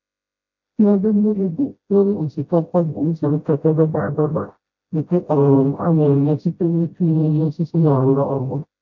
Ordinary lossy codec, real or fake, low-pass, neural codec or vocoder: MP3, 48 kbps; fake; 7.2 kHz; codec, 16 kHz, 0.5 kbps, FreqCodec, smaller model